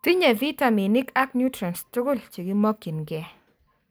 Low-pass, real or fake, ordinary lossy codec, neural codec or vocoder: none; real; none; none